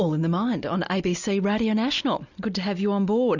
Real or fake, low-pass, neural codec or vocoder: real; 7.2 kHz; none